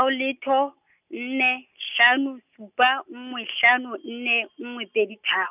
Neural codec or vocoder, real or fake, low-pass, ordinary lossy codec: none; real; 3.6 kHz; none